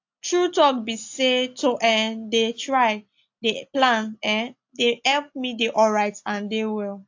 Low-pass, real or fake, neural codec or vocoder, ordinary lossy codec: 7.2 kHz; real; none; AAC, 48 kbps